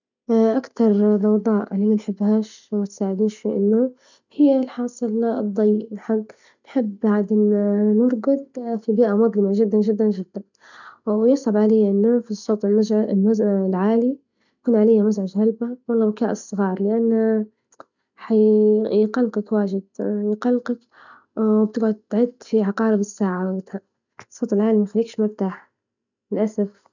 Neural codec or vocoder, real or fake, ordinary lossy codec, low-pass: none; real; none; 7.2 kHz